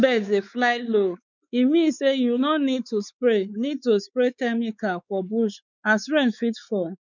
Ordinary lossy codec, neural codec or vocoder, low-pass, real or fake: none; codec, 16 kHz, 4 kbps, X-Codec, WavLM features, trained on Multilingual LibriSpeech; 7.2 kHz; fake